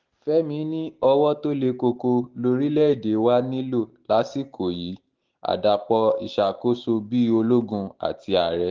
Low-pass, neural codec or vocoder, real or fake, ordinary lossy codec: 7.2 kHz; none; real; Opus, 24 kbps